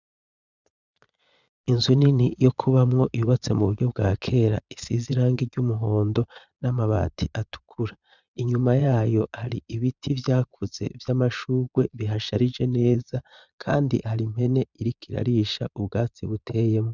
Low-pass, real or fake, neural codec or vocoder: 7.2 kHz; fake; vocoder, 22.05 kHz, 80 mel bands, Vocos